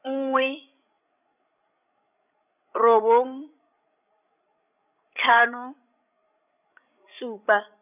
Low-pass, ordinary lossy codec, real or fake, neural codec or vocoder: 3.6 kHz; none; fake; codec, 16 kHz, 16 kbps, FreqCodec, larger model